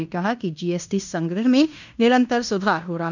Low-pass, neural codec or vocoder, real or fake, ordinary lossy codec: 7.2 kHz; codec, 16 kHz in and 24 kHz out, 0.9 kbps, LongCat-Audio-Codec, fine tuned four codebook decoder; fake; none